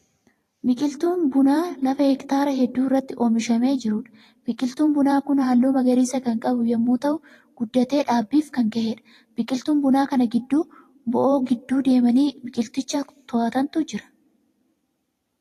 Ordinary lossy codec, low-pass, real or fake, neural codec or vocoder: AAC, 48 kbps; 14.4 kHz; fake; vocoder, 44.1 kHz, 128 mel bands every 512 samples, BigVGAN v2